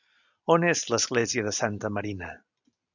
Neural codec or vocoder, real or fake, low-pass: none; real; 7.2 kHz